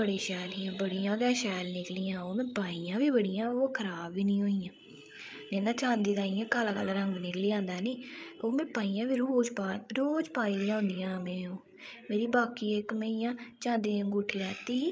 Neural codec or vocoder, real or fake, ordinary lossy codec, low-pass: codec, 16 kHz, 8 kbps, FreqCodec, larger model; fake; none; none